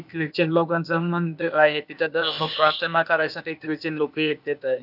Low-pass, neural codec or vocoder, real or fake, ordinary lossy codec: 5.4 kHz; codec, 16 kHz, 0.8 kbps, ZipCodec; fake; none